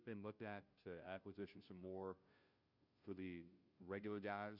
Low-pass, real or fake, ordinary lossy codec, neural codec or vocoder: 5.4 kHz; fake; Opus, 64 kbps; codec, 16 kHz, 0.5 kbps, FunCodec, trained on Chinese and English, 25 frames a second